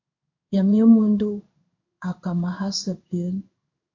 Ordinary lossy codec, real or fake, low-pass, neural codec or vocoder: MP3, 48 kbps; fake; 7.2 kHz; codec, 16 kHz in and 24 kHz out, 1 kbps, XY-Tokenizer